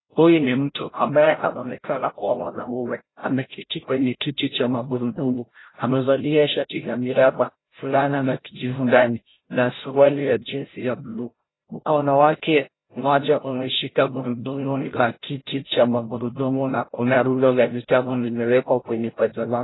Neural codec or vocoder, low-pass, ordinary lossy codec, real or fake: codec, 16 kHz, 0.5 kbps, FreqCodec, larger model; 7.2 kHz; AAC, 16 kbps; fake